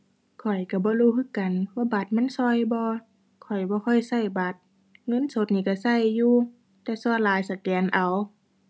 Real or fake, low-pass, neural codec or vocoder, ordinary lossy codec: real; none; none; none